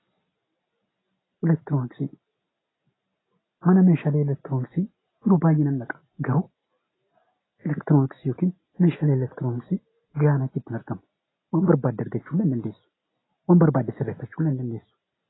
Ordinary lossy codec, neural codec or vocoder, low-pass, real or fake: AAC, 16 kbps; none; 7.2 kHz; real